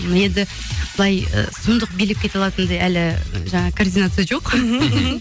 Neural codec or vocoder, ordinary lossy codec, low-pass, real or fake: none; none; none; real